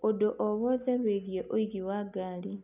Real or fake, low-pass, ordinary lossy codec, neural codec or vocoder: real; 3.6 kHz; none; none